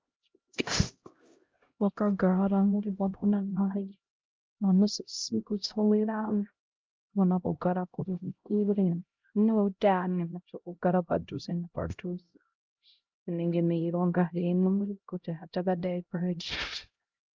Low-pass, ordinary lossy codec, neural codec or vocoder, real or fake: 7.2 kHz; Opus, 32 kbps; codec, 16 kHz, 0.5 kbps, X-Codec, HuBERT features, trained on LibriSpeech; fake